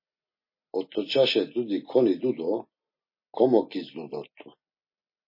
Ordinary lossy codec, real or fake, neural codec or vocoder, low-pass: MP3, 24 kbps; real; none; 5.4 kHz